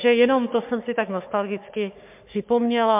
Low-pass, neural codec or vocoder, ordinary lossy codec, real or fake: 3.6 kHz; codec, 44.1 kHz, 3.4 kbps, Pupu-Codec; MP3, 32 kbps; fake